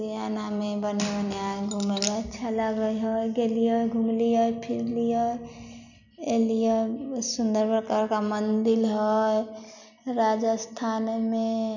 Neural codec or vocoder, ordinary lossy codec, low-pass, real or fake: none; none; 7.2 kHz; real